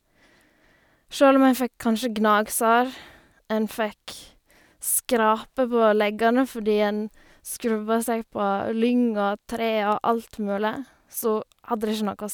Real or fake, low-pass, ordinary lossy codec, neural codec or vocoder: real; none; none; none